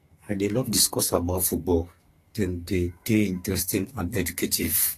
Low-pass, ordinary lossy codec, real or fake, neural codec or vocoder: 14.4 kHz; AAC, 48 kbps; fake; codec, 44.1 kHz, 2.6 kbps, SNAC